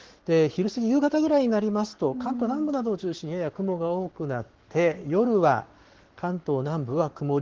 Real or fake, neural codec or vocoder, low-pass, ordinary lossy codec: fake; codec, 44.1 kHz, 7.8 kbps, Pupu-Codec; 7.2 kHz; Opus, 16 kbps